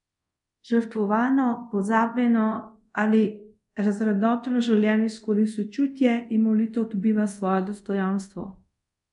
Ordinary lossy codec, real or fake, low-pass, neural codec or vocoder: none; fake; 10.8 kHz; codec, 24 kHz, 0.5 kbps, DualCodec